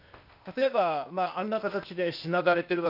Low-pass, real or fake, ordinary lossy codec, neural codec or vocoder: 5.4 kHz; fake; none; codec, 16 kHz, 0.8 kbps, ZipCodec